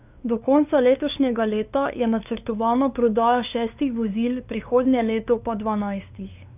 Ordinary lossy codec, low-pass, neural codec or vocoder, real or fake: none; 3.6 kHz; codec, 16 kHz, 2 kbps, FunCodec, trained on LibriTTS, 25 frames a second; fake